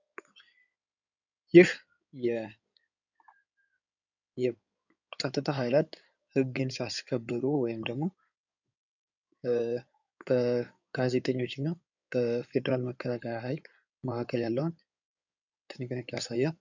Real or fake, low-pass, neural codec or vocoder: fake; 7.2 kHz; codec, 16 kHz in and 24 kHz out, 2.2 kbps, FireRedTTS-2 codec